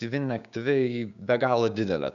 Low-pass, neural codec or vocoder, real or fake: 7.2 kHz; codec, 16 kHz, 6 kbps, DAC; fake